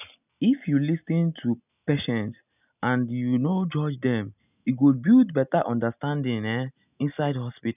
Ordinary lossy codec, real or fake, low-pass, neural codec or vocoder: none; real; 3.6 kHz; none